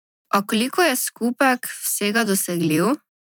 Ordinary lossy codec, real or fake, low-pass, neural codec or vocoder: none; fake; none; vocoder, 44.1 kHz, 128 mel bands every 512 samples, BigVGAN v2